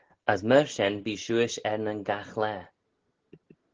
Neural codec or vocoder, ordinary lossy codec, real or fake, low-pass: none; Opus, 16 kbps; real; 7.2 kHz